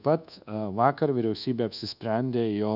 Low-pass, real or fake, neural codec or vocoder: 5.4 kHz; fake; codec, 24 kHz, 1.2 kbps, DualCodec